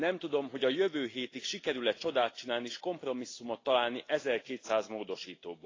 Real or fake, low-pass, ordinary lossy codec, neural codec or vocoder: real; 7.2 kHz; AAC, 32 kbps; none